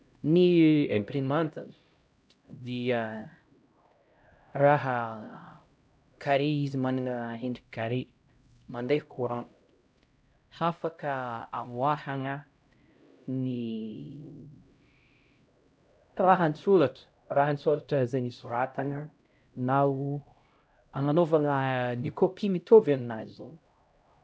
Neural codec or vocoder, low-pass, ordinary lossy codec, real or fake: codec, 16 kHz, 0.5 kbps, X-Codec, HuBERT features, trained on LibriSpeech; none; none; fake